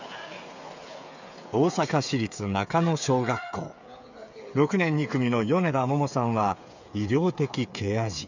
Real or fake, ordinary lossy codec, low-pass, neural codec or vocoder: fake; none; 7.2 kHz; codec, 16 kHz, 8 kbps, FreqCodec, smaller model